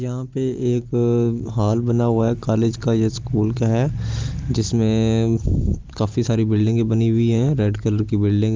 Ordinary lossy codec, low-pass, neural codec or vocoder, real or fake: Opus, 32 kbps; 7.2 kHz; none; real